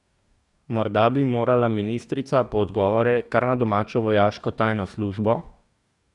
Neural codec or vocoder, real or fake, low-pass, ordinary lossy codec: codec, 44.1 kHz, 2.6 kbps, DAC; fake; 10.8 kHz; none